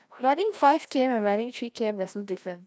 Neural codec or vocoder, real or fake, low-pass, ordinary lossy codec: codec, 16 kHz, 1 kbps, FreqCodec, larger model; fake; none; none